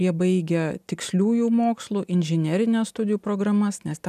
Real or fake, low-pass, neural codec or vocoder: real; 14.4 kHz; none